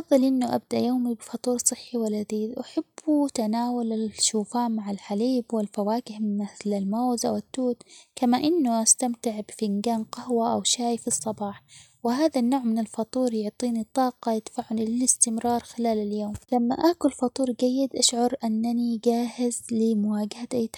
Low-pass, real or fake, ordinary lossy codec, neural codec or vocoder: 19.8 kHz; real; none; none